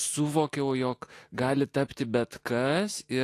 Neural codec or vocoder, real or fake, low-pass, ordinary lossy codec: autoencoder, 48 kHz, 128 numbers a frame, DAC-VAE, trained on Japanese speech; fake; 14.4 kHz; AAC, 48 kbps